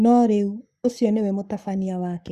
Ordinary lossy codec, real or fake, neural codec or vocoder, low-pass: Opus, 64 kbps; fake; codec, 44.1 kHz, 7.8 kbps, Pupu-Codec; 14.4 kHz